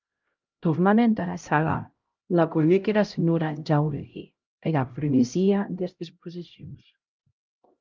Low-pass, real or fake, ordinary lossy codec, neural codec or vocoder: 7.2 kHz; fake; Opus, 24 kbps; codec, 16 kHz, 0.5 kbps, X-Codec, HuBERT features, trained on LibriSpeech